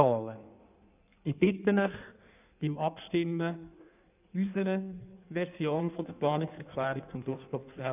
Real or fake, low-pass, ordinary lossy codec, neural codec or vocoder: fake; 3.6 kHz; none; codec, 16 kHz in and 24 kHz out, 1.1 kbps, FireRedTTS-2 codec